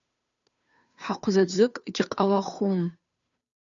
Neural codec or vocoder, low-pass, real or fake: codec, 16 kHz, 2 kbps, FunCodec, trained on Chinese and English, 25 frames a second; 7.2 kHz; fake